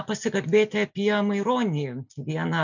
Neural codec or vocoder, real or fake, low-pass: none; real; 7.2 kHz